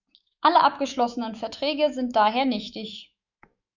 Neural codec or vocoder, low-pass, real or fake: autoencoder, 48 kHz, 128 numbers a frame, DAC-VAE, trained on Japanese speech; 7.2 kHz; fake